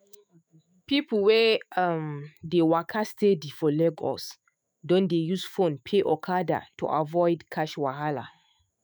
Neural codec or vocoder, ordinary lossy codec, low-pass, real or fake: autoencoder, 48 kHz, 128 numbers a frame, DAC-VAE, trained on Japanese speech; none; none; fake